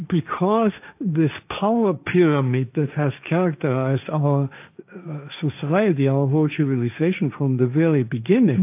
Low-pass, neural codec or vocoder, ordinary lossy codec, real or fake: 3.6 kHz; codec, 16 kHz, 1.1 kbps, Voila-Tokenizer; AAC, 32 kbps; fake